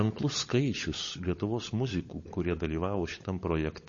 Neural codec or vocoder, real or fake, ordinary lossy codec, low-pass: codec, 16 kHz, 16 kbps, FunCodec, trained on LibriTTS, 50 frames a second; fake; MP3, 32 kbps; 7.2 kHz